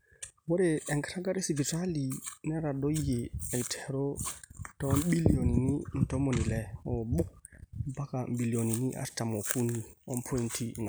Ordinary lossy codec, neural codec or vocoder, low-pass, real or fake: none; none; none; real